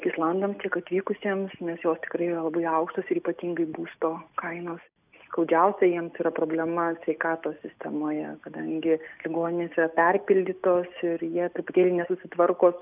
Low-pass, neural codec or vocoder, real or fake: 3.6 kHz; none; real